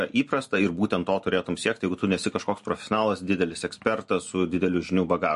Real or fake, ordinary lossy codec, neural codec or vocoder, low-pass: real; MP3, 48 kbps; none; 14.4 kHz